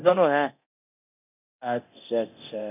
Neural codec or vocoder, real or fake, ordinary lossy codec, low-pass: codec, 24 kHz, 0.9 kbps, DualCodec; fake; none; 3.6 kHz